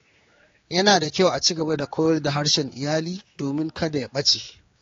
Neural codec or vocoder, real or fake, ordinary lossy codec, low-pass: codec, 16 kHz, 4 kbps, X-Codec, HuBERT features, trained on general audio; fake; AAC, 32 kbps; 7.2 kHz